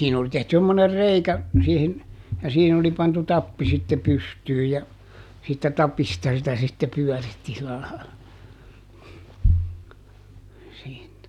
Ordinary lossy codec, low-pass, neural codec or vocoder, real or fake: none; 19.8 kHz; none; real